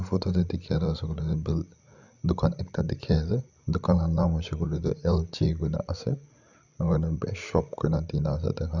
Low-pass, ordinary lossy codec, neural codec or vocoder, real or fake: 7.2 kHz; none; codec, 16 kHz, 16 kbps, FreqCodec, larger model; fake